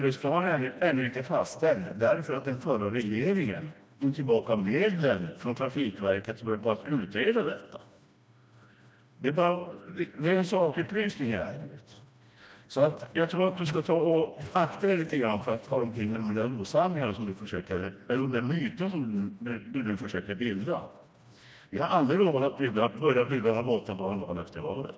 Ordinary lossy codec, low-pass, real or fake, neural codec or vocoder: none; none; fake; codec, 16 kHz, 1 kbps, FreqCodec, smaller model